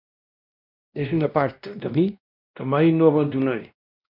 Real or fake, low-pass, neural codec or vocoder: fake; 5.4 kHz; codec, 16 kHz, 1 kbps, X-Codec, WavLM features, trained on Multilingual LibriSpeech